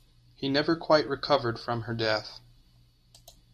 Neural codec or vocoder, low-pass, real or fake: none; 14.4 kHz; real